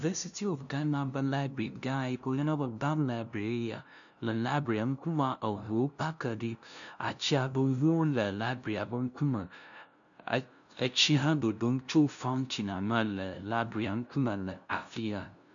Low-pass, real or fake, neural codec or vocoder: 7.2 kHz; fake; codec, 16 kHz, 0.5 kbps, FunCodec, trained on LibriTTS, 25 frames a second